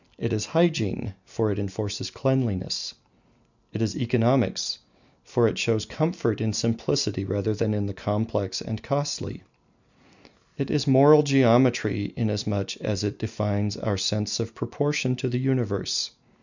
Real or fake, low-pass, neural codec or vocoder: real; 7.2 kHz; none